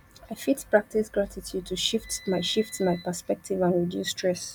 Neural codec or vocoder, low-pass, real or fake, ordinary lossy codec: none; 19.8 kHz; real; none